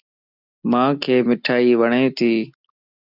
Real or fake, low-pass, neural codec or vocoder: real; 5.4 kHz; none